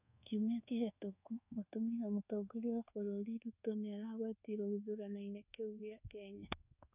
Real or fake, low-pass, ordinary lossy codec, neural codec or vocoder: fake; 3.6 kHz; none; codec, 24 kHz, 1.2 kbps, DualCodec